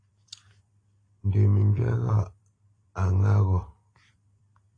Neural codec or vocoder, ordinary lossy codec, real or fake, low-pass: vocoder, 44.1 kHz, 128 mel bands every 256 samples, BigVGAN v2; AAC, 32 kbps; fake; 9.9 kHz